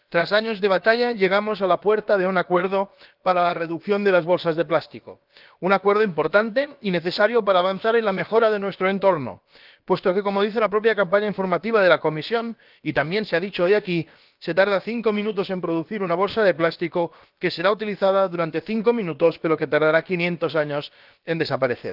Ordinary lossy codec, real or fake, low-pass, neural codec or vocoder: Opus, 32 kbps; fake; 5.4 kHz; codec, 16 kHz, about 1 kbps, DyCAST, with the encoder's durations